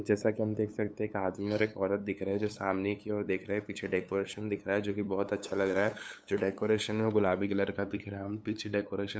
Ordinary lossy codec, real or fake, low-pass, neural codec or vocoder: none; fake; none; codec, 16 kHz, 8 kbps, FunCodec, trained on LibriTTS, 25 frames a second